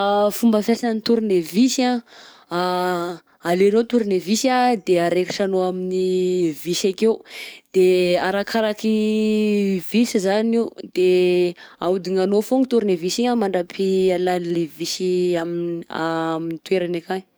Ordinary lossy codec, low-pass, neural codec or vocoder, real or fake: none; none; codec, 44.1 kHz, 7.8 kbps, DAC; fake